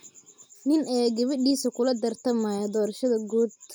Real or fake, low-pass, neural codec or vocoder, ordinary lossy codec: real; none; none; none